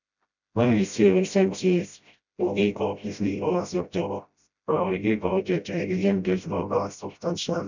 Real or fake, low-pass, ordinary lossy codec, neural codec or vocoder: fake; 7.2 kHz; none; codec, 16 kHz, 0.5 kbps, FreqCodec, smaller model